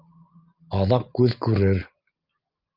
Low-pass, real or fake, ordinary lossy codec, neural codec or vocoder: 5.4 kHz; real; Opus, 24 kbps; none